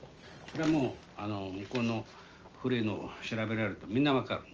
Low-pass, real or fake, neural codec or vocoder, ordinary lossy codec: 7.2 kHz; real; none; Opus, 24 kbps